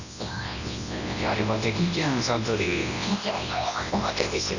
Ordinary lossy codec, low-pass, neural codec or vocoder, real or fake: none; 7.2 kHz; codec, 24 kHz, 0.9 kbps, WavTokenizer, large speech release; fake